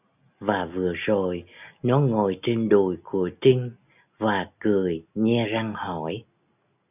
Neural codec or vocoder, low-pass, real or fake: none; 3.6 kHz; real